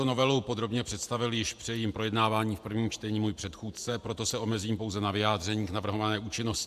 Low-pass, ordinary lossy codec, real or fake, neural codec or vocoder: 14.4 kHz; MP3, 96 kbps; fake; vocoder, 48 kHz, 128 mel bands, Vocos